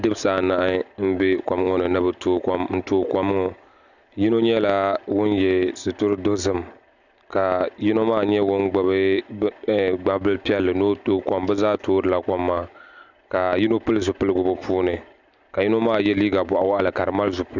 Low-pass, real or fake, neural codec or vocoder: 7.2 kHz; real; none